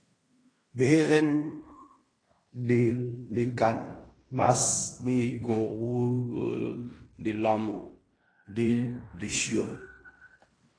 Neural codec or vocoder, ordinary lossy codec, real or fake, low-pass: codec, 16 kHz in and 24 kHz out, 0.9 kbps, LongCat-Audio-Codec, fine tuned four codebook decoder; AAC, 32 kbps; fake; 9.9 kHz